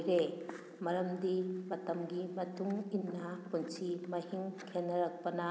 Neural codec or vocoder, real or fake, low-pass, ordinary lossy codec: none; real; none; none